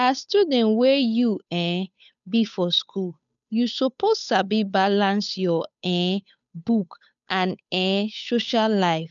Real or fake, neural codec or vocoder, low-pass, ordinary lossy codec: fake; codec, 16 kHz, 8 kbps, FunCodec, trained on Chinese and English, 25 frames a second; 7.2 kHz; none